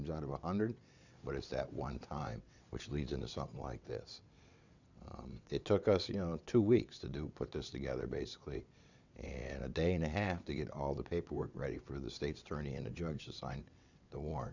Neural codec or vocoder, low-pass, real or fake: vocoder, 22.05 kHz, 80 mel bands, WaveNeXt; 7.2 kHz; fake